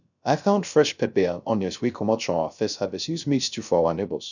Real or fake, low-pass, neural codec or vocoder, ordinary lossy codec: fake; 7.2 kHz; codec, 16 kHz, 0.3 kbps, FocalCodec; none